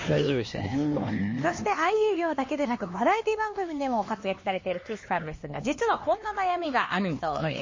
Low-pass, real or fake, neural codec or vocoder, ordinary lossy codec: 7.2 kHz; fake; codec, 16 kHz, 2 kbps, X-Codec, HuBERT features, trained on LibriSpeech; MP3, 32 kbps